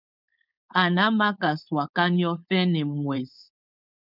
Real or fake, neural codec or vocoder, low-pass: fake; codec, 16 kHz, 4.8 kbps, FACodec; 5.4 kHz